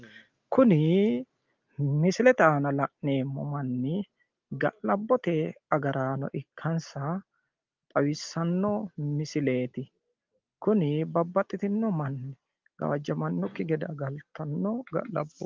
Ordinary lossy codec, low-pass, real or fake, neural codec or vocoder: Opus, 32 kbps; 7.2 kHz; real; none